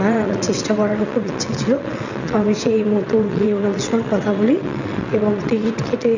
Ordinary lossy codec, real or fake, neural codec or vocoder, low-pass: none; fake; vocoder, 22.05 kHz, 80 mel bands, WaveNeXt; 7.2 kHz